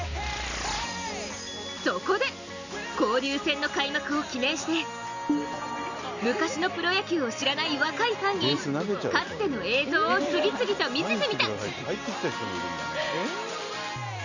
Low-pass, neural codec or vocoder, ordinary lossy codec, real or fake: 7.2 kHz; none; none; real